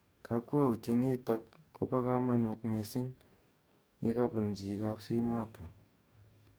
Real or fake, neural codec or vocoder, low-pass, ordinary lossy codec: fake; codec, 44.1 kHz, 2.6 kbps, DAC; none; none